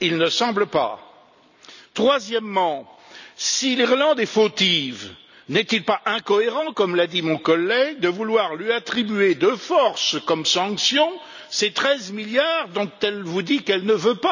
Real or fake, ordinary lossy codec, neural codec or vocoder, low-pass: real; none; none; 7.2 kHz